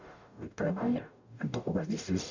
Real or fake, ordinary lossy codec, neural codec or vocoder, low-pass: fake; none; codec, 44.1 kHz, 0.9 kbps, DAC; 7.2 kHz